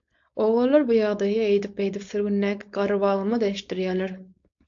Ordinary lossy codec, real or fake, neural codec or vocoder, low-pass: AAC, 64 kbps; fake; codec, 16 kHz, 4.8 kbps, FACodec; 7.2 kHz